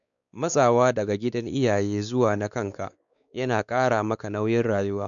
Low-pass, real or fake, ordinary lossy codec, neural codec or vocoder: 7.2 kHz; fake; none; codec, 16 kHz, 4 kbps, X-Codec, WavLM features, trained on Multilingual LibriSpeech